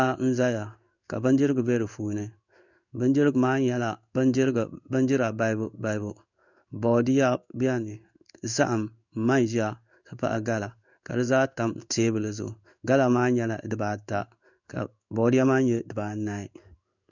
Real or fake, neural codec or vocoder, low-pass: fake; codec, 16 kHz in and 24 kHz out, 1 kbps, XY-Tokenizer; 7.2 kHz